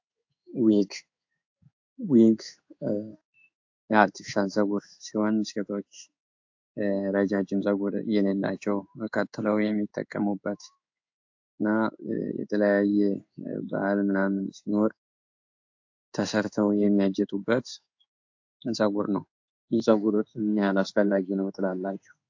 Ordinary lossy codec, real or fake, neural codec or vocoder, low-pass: AAC, 48 kbps; fake; codec, 16 kHz in and 24 kHz out, 1 kbps, XY-Tokenizer; 7.2 kHz